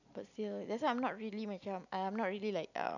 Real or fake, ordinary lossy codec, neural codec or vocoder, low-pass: real; none; none; 7.2 kHz